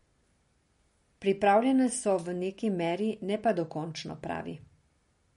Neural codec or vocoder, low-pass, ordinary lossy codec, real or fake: none; 10.8 kHz; MP3, 48 kbps; real